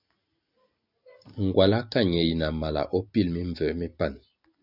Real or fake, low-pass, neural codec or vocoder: real; 5.4 kHz; none